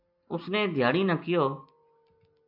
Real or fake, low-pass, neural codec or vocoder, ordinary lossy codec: real; 5.4 kHz; none; MP3, 48 kbps